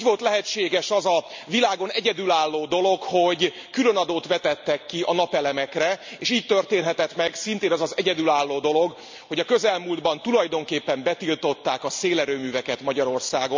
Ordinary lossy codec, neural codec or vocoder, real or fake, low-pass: MP3, 64 kbps; none; real; 7.2 kHz